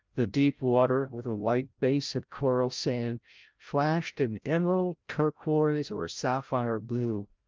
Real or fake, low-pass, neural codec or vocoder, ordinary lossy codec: fake; 7.2 kHz; codec, 16 kHz, 0.5 kbps, FreqCodec, larger model; Opus, 24 kbps